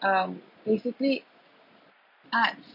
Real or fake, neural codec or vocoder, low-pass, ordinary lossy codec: real; none; 5.4 kHz; none